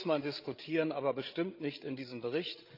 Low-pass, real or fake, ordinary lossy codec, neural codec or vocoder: 5.4 kHz; fake; Opus, 24 kbps; codec, 16 kHz, 16 kbps, FreqCodec, larger model